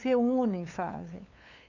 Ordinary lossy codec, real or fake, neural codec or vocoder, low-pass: none; fake; codec, 16 kHz, 8 kbps, FunCodec, trained on LibriTTS, 25 frames a second; 7.2 kHz